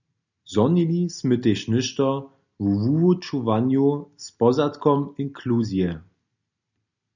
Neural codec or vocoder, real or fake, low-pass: none; real; 7.2 kHz